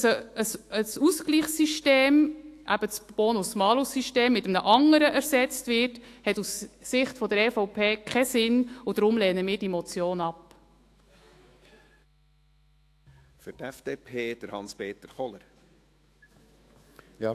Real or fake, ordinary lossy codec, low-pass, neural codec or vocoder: fake; AAC, 64 kbps; 14.4 kHz; autoencoder, 48 kHz, 128 numbers a frame, DAC-VAE, trained on Japanese speech